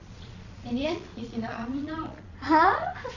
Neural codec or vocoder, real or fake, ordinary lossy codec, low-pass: vocoder, 22.05 kHz, 80 mel bands, Vocos; fake; none; 7.2 kHz